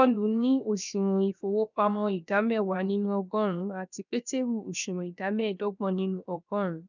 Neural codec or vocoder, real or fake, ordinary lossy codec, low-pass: codec, 16 kHz, 0.7 kbps, FocalCodec; fake; none; 7.2 kHz